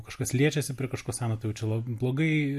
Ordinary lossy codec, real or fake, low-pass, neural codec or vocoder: MP3, 64 kbps; real; 14.4 kHz; none